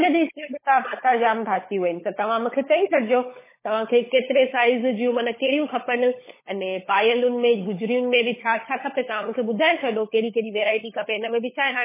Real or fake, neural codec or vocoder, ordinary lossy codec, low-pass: fake; codec, 16 kHz, 16 kbps, FunCodec, trained on LibriTTS, 50 frames a second; MP3, 16 kbps; 3.6 kHz